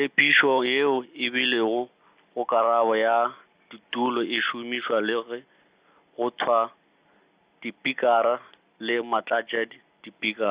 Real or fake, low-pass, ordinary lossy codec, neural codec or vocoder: real; 3.6 kHz; Opus, 64 kbps; none